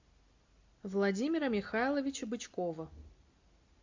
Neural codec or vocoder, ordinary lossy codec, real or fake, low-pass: none; MP3, 48 kbps; real; 7.2 kHz